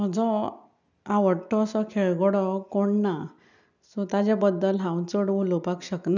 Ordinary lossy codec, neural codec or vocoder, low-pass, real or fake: none; none; 7.2 kHz; real